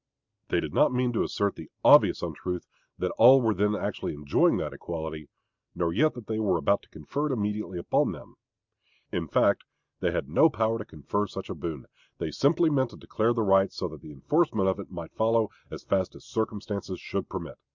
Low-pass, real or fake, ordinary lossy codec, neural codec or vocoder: 7.2 kHz; real; Opus, 64 kbps; none